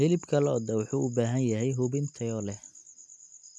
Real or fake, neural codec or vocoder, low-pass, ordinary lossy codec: real; none; none; none